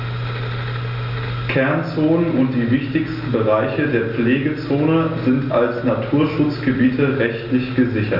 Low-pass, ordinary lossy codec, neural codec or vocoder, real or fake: 5.4 kHz; Opus, 64 kbps; none; real